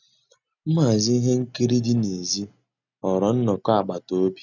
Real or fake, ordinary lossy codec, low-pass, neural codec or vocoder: real; none; 7.2 kHz; none